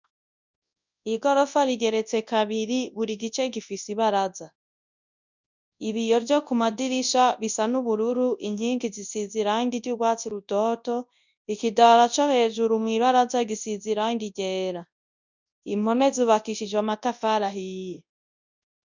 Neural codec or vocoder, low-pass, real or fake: codec, 24 kHz, 0.9 kbps, WavTokenizer, large speech release; 7.2 kHz; fake